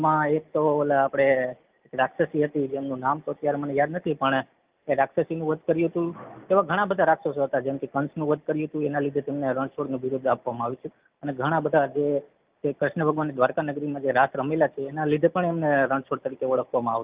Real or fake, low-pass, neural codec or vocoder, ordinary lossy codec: real; 3.6 kHz; none; Opus, 24 kbps